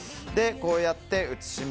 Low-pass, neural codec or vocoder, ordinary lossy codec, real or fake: none; none; none; real